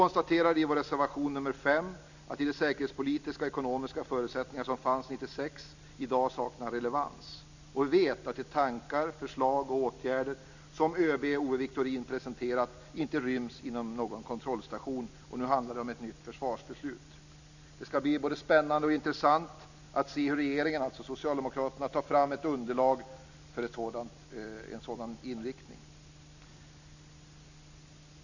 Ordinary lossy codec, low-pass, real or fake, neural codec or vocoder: none; 7.2 kHz; real; none